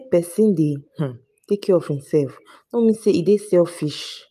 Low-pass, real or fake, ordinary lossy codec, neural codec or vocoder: 14.4 kHz; real; none; none